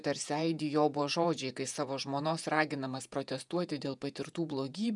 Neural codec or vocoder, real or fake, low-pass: vocoder, 48 kHz, 128 mel bands, Vocos; fake; 10.8 kHz